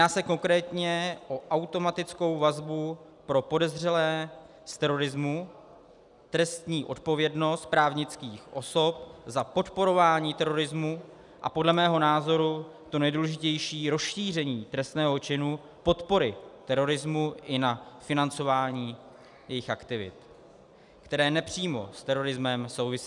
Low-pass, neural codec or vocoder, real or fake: 10.8 kHz; none; real